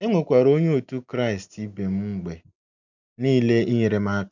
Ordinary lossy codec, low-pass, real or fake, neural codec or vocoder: none; 7.2 kHz; real; none